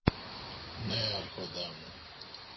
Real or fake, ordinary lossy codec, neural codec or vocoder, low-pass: real; MP3, 24 kbps; none; 7.2 kHz